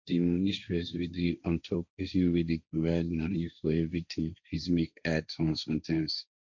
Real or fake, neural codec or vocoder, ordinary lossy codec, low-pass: fake; codec, 16 kHz, 1.1 kbps, Voila-Tokenizer; none; 7.2 kHz